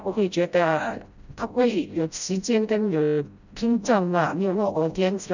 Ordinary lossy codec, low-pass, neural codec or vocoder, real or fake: none; 7.2 kHz; codec, 16 kHz, 0.5 kbps, FreqCodec, smaller model; fake